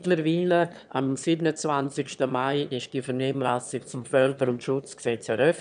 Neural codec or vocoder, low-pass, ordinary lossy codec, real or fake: autoencoder, 22.05 kHz, a latent of 192 numbers a frame, VITS, trained on one speaker; 9.9 kHz; none; fake